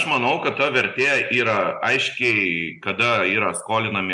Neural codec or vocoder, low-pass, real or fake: none; 10.8 kHz; real